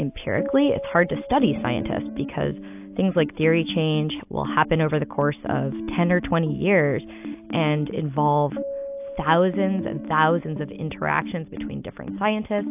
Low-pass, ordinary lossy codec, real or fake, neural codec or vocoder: 3.6 kHz; AAC, 32 kbps; real; none